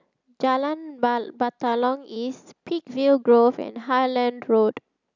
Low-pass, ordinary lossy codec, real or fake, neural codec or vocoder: 7.2 kHz; none; real; none